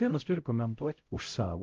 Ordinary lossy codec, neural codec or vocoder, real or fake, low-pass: Opus, 32 kbps; codec, 16 kHz, 0.5 kbps, X-Codec, HuBERT features, trained on LibriSpeech; fake; 7.2 kHz